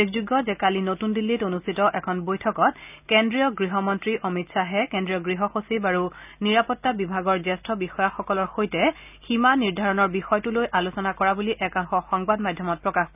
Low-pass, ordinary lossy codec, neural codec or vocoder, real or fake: 3.6 kHz; none; none; real